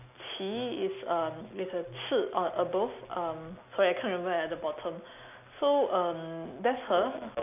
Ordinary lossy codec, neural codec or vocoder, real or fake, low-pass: none; none; real; 3.6 kHz